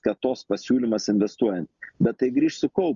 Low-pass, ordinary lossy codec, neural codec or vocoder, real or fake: 7.2 kHz; Opus, 64 kbps; none; real